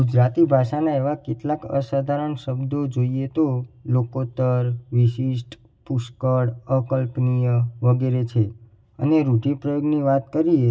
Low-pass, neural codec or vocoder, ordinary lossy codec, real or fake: none; none; none; real